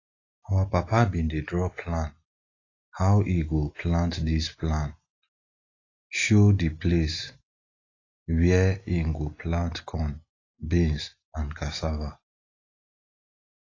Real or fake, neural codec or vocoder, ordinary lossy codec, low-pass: real; none; AAC, 32 kbps; 7.2 kHz